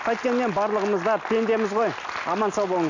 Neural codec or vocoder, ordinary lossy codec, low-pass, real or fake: none; none; 7.2 kHz; real